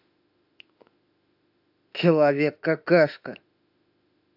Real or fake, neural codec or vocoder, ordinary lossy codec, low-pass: fake; autoencoder, 48 kHz, 32 numbers a frame, DAC-VAE, trained on Japanese speech; AAC, 48 kbps; 5.4 kHz